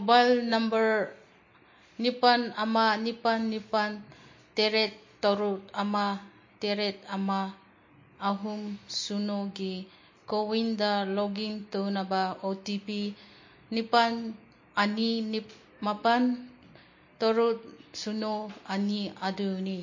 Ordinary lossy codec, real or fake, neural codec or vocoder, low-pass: MP3, 32 kbps; real; none; 7.2 kHz